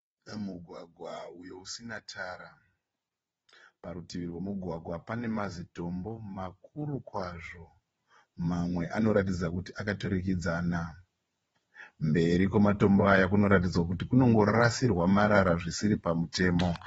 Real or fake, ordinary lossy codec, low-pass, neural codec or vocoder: fake; AAC, 24 kbps; 9.9 kHz; vocoder, 22.05 kHz, 80 mel bands, WaveNeXt